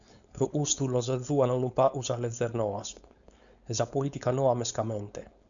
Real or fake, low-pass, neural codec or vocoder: fake; 7.2 kHz; codec, 16 kHz, 4.8 kbps, FACodec